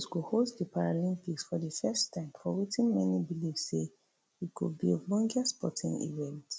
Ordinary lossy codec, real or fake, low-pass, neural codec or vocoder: none; real; none; none